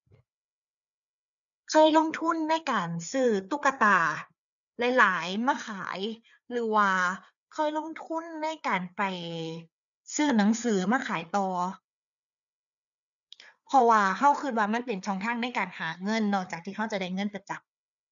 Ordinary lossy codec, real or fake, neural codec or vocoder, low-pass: none; fake; codec, 16 kHz, 4 kbps, FreqCodec, larger model; 7.2 kHz